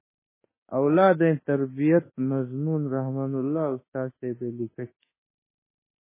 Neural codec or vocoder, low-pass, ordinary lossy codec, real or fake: autoencoder, 48 kHz, 32 numbers a frame, DAC-VAE, trained on Japanese speech; 3.6 kHz; MP3, 16 kbps; fake